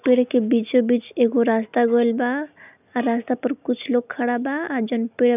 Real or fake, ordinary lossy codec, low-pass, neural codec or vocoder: real; none; 3.6 kHz; none